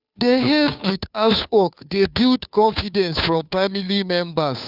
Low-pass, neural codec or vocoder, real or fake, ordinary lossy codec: 5.4 kHz; codec, 16 kHz, 2 kbps, FunCodec, trained on Chinese and English, 25 frames a second; fake; none